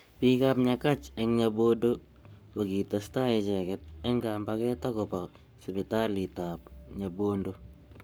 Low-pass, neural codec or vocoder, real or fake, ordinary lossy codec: none; codec, 44.1 kHz, 7.8 kbps, Pupu-Codec; fake; none